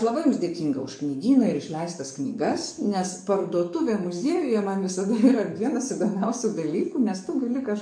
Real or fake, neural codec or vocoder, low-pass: fake; codec, 44.1 kHz, 7.8 kbps, DAC; 9.9 kHz